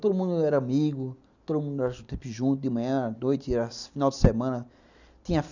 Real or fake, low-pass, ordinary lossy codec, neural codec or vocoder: real; 7.2 kHz; none; none